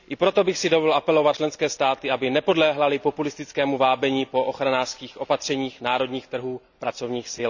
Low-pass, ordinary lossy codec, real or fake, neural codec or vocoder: 7.2 kHz; none; real; none